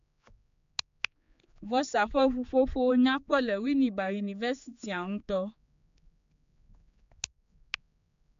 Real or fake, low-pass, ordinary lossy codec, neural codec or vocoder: fake; 7.2 kHz; MP3, 64 kbps; codec, 16 kHz, 4 kbps, X-Codec, HuBERT features, trained on general audio